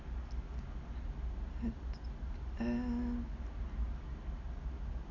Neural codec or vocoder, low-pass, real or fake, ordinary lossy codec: none; 7.2 kHz; real; AAC, 48 kbps